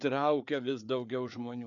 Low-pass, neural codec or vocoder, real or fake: 7.2 kHz; codec, 16 kHz, 4 kbps, FreqCodec, larger model; fake